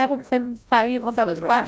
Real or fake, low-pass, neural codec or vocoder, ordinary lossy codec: fake; none; codec, 16 kHz, 0.5 kbps, FreqCodec, larger model; none